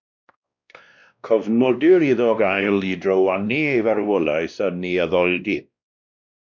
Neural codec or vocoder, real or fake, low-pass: codec, 16 kHz, 1 kbps, X-Codec, WavLM features, trained on Multilingual LibriSpeech; fake; 7.2 kHz